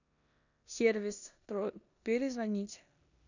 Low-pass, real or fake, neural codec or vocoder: 7.2 kHz; fake; codec, 16 kHz in and 24 kHz out, 0.9 kbps, LongCat-Audio-Codec, four codebook decoder